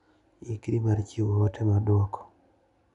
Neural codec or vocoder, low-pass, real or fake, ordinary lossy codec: none; 10.8 kHz; real; none